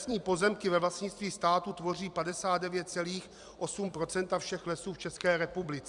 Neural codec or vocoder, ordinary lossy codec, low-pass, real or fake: vocoder, 44.1 kHz, 128 mel bands every 256 samples, BigVGAN v2; Opus, 64 kbps; 10.8 kHz; fake